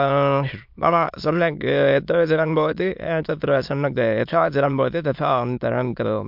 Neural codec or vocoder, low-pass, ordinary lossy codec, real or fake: autoencoder, 22.05 kHz, a latent of 192 numbers a frame, VITS, trained on many speakers; 5.4 kHz; MP3, 48 kbps; fake